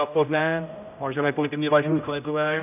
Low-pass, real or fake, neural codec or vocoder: 3.6 kHz; fake; codec, 16 kHz, 0.5 kbps, X-Codec, HuBERT features, trained on general audio